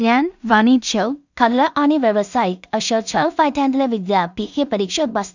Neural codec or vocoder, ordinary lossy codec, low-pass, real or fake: codec, 16 kHz in and 24 kHz out, 0.4 kbps, LongCat-Audio-Codec, two codebook decoder; none; 7.2 kHz; fake